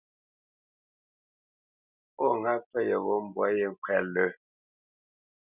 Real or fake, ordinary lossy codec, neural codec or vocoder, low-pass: real; Opus, 64 kbps; none; 3.6 kHz